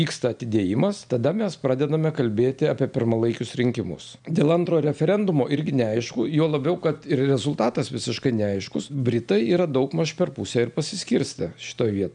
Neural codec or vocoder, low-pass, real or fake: none; 9.9 kHz; real